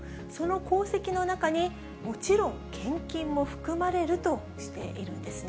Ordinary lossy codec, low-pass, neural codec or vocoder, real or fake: none; none; none; real